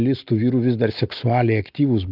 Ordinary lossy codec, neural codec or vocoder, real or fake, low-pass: Opus, 32 kbps; none; real; 5.4 kHz